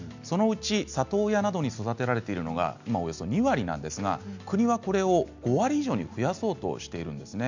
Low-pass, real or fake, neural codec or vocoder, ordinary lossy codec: 7.2 kHz; real; none; none